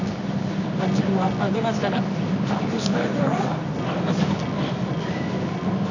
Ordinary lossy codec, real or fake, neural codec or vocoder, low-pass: none; fake; codec, 24 kHz, 0.9 kbps, WavTokenizer, medium music audio release; 7.2 kHz